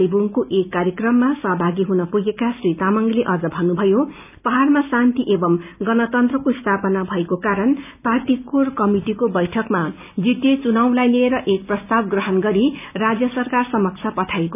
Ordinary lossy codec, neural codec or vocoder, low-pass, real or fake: none; none; 3.6 kHz; real